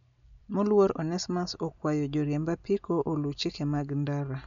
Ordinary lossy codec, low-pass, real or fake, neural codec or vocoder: none; 7.2 kHz; real; none